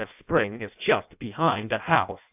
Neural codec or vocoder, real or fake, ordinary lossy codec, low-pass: codec, 16 kHz in and 24 kHz out, 0.6 kbps, FireRedTTS-2 codec; fake; AAC, 32 kbps; 3.6 kHz